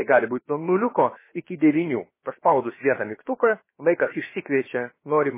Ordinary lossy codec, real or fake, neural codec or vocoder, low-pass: MP3, 16 kbps; fake; codec, 16 kHz, about 1 kbps, DyCAST, with the encoder's durations; 3.6 kHz